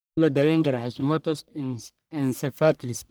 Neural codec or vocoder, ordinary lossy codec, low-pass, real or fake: codec, 44.1 kHz, 1.7 kbps, Pupu-Codec; none; none; fake